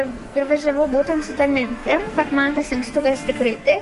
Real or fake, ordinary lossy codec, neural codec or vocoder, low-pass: fake; MP3, 48 kbps; codec, 44.1 kHz, 2.6 kbps, SNAC; 14.4 kHz